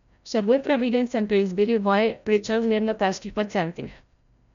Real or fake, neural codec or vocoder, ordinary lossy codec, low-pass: fake; codec, 16 kHz, 0.5 kbps, FreqCodec, larger model; none; 7.2 kHz